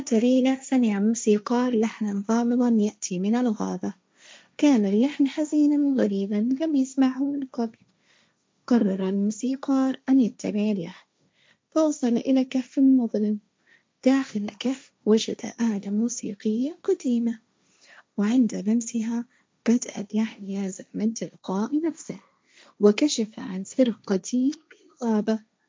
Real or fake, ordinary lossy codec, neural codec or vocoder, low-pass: fake; none; codec, 16 kHz, 1.1 kbps, Voila-Tokenizer; none